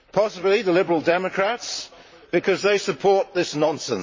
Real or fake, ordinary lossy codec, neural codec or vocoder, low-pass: fake; MP3, 32 kbps; vocoder, 44.1 kHz, 128 mel bands, Pupu-Vocoder; 7.2 kHz